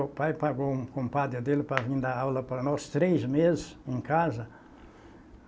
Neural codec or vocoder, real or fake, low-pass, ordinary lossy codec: none; real; none; none